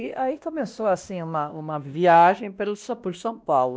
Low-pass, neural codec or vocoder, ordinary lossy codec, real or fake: none; codec, 16 kHz, 1 kbps, X-Codec, WavLM features, trained on Multilingual LibriSpeech; none; fake